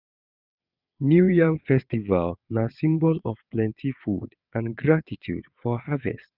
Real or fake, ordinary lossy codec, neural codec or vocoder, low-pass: fake; none; vocoder, 22.05 kHz, 80 mel bands, WaveNeXt; 5.4 kHz